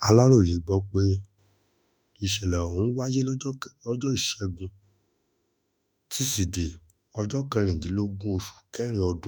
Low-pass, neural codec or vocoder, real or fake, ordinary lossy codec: none; autoencoder, 48 kHz, 32 numbers a frame, DAC-VAE, trained on Japanese speech; fake; none